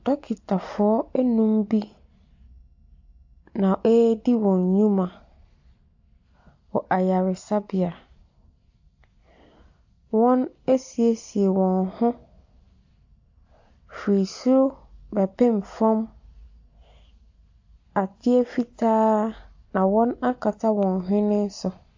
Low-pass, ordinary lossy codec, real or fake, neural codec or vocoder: 7.2 kHz; AAC, 48 kbps; real; none